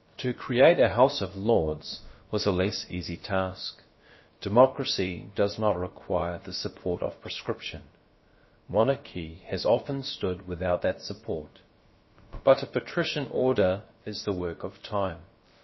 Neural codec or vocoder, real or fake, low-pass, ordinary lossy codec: codec, 16 kHz, about 1 kbps, DyCAST, with the encoder's durations; fake; 7.2 kHz; MP3, 24 kbps